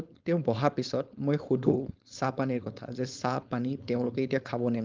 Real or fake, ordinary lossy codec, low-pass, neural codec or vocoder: fake; Opus, 32 kbps; 7.2 kHz; codec, 16 kHz, 4.8 kbps, FACodec